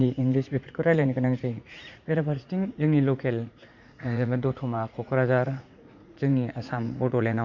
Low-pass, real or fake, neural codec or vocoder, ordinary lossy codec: 7.2 kHz; fake; vocoder, 44.1 kHz, 80 mel bands, Vocos; Opus, 64 kbps